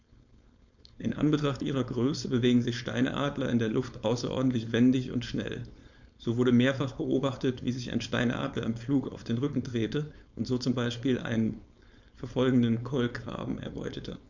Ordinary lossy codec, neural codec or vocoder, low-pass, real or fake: none; codec, 16 kHz, 4.8 kbps, FACodec; 7.2 kHz; fake